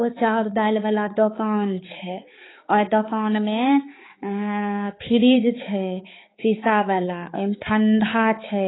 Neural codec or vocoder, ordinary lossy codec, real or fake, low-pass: codec, 16 kHz, 4 kbps, X-Codec, HuBERT features, trained on balanced general audio; AAC, 16 kbps; fake; 7.2 kHz